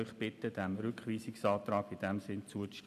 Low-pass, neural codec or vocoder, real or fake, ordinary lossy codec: 14.4 kHz; none; real; none